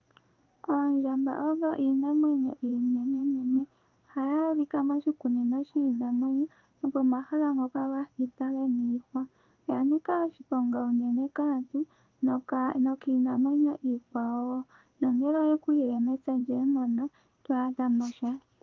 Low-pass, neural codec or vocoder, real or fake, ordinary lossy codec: 7.2 kHz; codec, 16 kHz in and 24 kHz out, 1 kbps, XY-Tokenizer; fake; Opus, 24 kbps